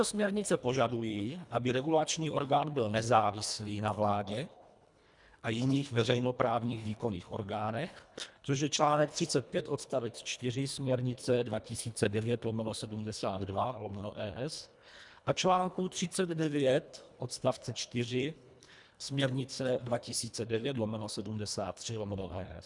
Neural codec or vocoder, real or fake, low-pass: codec, 24 kHz, 1.5 kbps, HILCodec; fake; 10.8 kHz